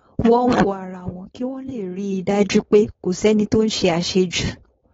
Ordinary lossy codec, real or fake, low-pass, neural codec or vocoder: AAC, 24 kbps; fake; 7.2 kHz; codec, 16 kHz, 4.8 kbps, FACodec